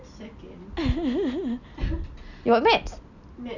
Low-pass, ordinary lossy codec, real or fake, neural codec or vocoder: 7.2 kHz; none; real; none